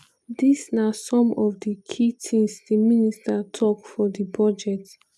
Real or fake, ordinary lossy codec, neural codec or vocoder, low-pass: real; none; none; none